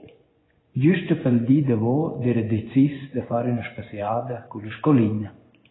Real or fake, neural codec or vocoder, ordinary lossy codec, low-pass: fake; vocoder, 24 kHz, 100 mel bands, Vocos; AAC, 16 kbps; 7.2 kHz